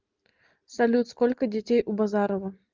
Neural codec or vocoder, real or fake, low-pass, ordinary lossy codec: none; real; 7.2 kHz; Opus, 24 kbps